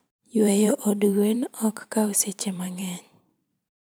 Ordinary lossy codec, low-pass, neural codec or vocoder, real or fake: none; none; vocoder, 44.1 kHz, 128 mel bands every 512 samples, BigVGAN v2; fake